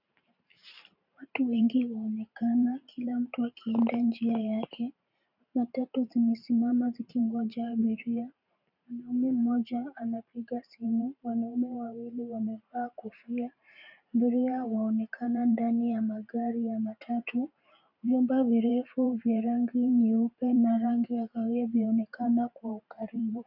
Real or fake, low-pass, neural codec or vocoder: fake; 5.4 kHz; vocoder, 44.1 kHz, 128 mel bands every 512 samples, BigVGAN v2